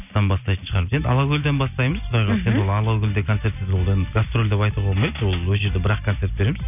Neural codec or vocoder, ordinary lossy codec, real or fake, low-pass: none; none; real; 3.6 kHz